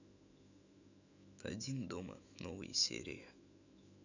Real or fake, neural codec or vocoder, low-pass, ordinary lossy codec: fake; autoencoder, 48 kHz, 128 numbers a frame, DAC-VAE, trained on Japanese speech; 7.2 kHz; none